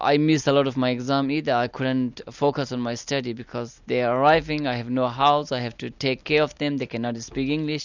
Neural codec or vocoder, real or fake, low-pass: none; real; 7.2 kHz